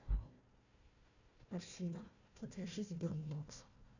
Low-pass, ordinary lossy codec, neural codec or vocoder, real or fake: 7.2 kHz; none; codec, 16 kHz, 1 kbps, FunCodec, trained on Chinese and English, 50 frames a second; fake